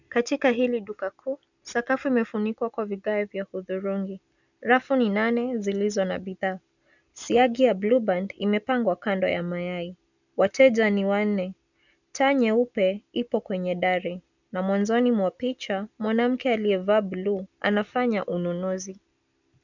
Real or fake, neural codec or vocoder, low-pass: real; none; 7.2 kHz